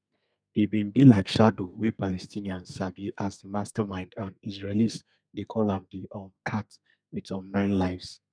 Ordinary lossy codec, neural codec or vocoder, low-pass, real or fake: none; codec, 32 kHz, 1.9 kbps, SNAC; 9.9 kHz; fake